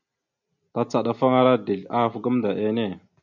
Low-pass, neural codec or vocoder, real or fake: 7.2 kHz; none; real